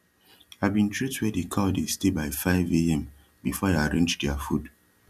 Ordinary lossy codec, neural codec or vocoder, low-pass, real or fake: none; none; 14.4 kHz; real